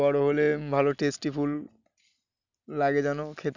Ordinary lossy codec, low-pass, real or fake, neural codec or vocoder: none; 7.2 kHz; real; none